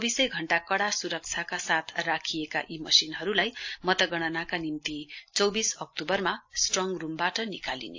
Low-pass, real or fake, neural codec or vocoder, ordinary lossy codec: 7.2 kHz; real; none; AAC, 48 kbps